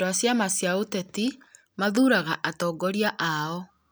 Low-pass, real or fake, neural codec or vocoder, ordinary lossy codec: none; real; none; none